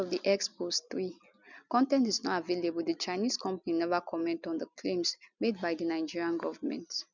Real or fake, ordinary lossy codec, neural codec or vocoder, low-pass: real; none; none; 7.2 kHz